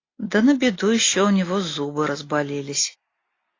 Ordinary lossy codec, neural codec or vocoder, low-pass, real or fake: AAC, 32 kbps; none; 7.2 kHz; real